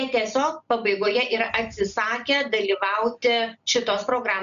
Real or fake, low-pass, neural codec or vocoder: real; 7.2 kHz; none